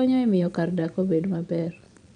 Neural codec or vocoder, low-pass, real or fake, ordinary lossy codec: vocoder, 22.05 kHz, 80 mel bands, Vocos; 9.9 kHz; fake; MP3, 96 kbps